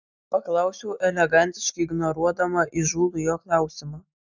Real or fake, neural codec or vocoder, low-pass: real; none; 7.2 kHz